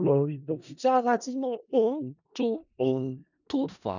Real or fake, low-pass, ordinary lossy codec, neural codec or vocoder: fake; 7.2 kHz; none; codec, 16 kHz in and 24 kHz out, 0.4 kbps, LongCat-Audio-Codec, four codebook decoder